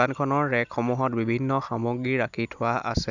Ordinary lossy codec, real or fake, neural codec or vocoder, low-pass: none; real; none; 7.2 kHz